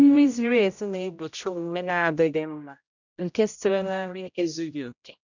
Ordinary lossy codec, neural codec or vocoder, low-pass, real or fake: none; codec, 16 kHz, 0.5 kbps, X-Codec, HuBERT features, trained on general audio; 7.2 kHz; fake